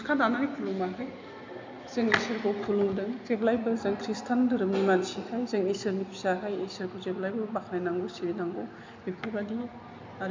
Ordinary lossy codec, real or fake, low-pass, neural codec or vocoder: MP3, 64 kbps; real; 7.2 kHz; none